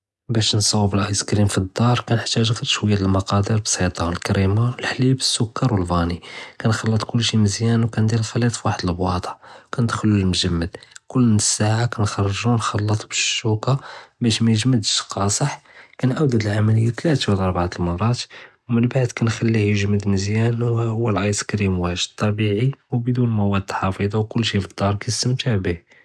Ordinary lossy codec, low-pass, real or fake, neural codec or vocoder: none; none; real; none